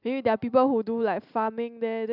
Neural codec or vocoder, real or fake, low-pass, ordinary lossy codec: none; real; 5.4 kHz; none